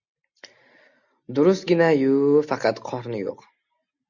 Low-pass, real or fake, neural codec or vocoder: 7.2 kHz; real; none